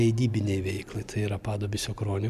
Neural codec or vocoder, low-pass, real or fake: vocoder, 44.1 kHz, 128 mel bands every 256 samples, BigVGAN v2; 14.4 kHz; fake